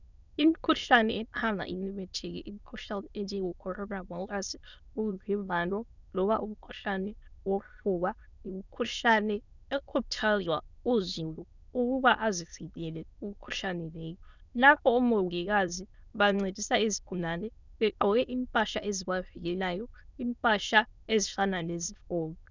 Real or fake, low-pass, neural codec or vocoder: fake; 7.2 kHz; autoencoder, 22.05 kHz, a latent of 192 numbers a frame, VITS, trained on many speakers